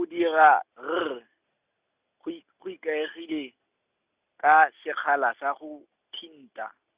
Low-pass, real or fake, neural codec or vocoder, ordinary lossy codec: 3.6 kHz; real; none; Opus, 64 kbps